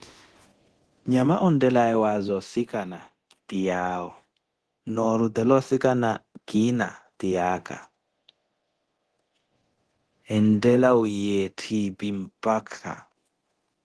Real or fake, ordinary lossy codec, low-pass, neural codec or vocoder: fake; Opus, 16 kbps; 10.8 kHz; codec, 24 kHz, 0.9 kbps, DualCodec